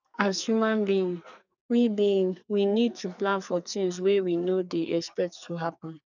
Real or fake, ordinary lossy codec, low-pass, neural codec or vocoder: fake; none; 7.2 kHz; codec, 44.1 kHz, 2.6 kbps, SNAC